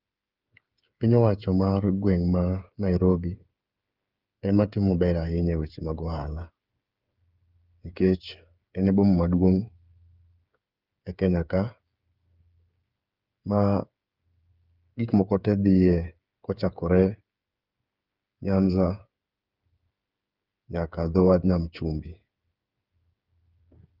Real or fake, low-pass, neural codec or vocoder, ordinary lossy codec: fake; 5.4 kHz; codec, 16 kHz, 8 kbps, FreqCodec, smaller model; Opus, 24 kbps